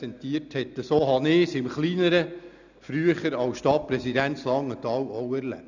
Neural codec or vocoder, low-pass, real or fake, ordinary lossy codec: none; 7.2 kHz; real; none